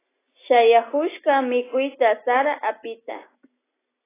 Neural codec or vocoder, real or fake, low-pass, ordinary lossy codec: none; real; 3.6 kHz; AAC, 16 kbps